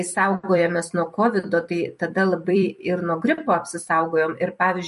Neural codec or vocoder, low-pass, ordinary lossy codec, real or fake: vocoder, 44.1 kHz, 128 mel bands every 256 samples, BigVGAN v2; 14.4 kHz; MP3, 48 kbps; fake